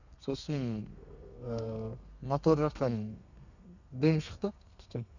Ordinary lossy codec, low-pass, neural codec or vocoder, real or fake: none; 7.2 kHz; codec, 32 kHz, 1.9 kbps, SNAC; fake